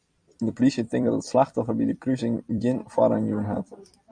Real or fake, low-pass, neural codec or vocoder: fake; 9.9 kHz; vocoder, 44.1 kHz, 128 mel bands every 256 samples, BigVGAN v2